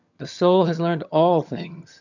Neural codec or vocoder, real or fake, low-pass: vocoder, 22.05 kHz, 80 mel bands, HiFi-GAN; fake; 7.2 kHz